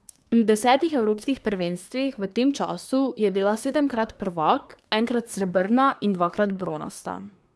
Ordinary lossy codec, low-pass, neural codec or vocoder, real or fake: none; none; codec, 24 kHz, 1 kbps, SNAC; fake